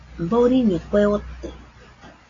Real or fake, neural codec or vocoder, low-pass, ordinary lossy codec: real; none; 7.2 kHz; AAC, 32 kbps